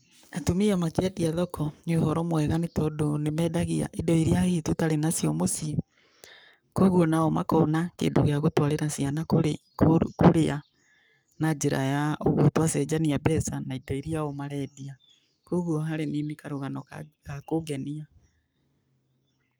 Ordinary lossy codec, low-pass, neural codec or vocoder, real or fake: none; none; codec, 44.1 kHz, 7.8 kbps, Pupu-Codec; fake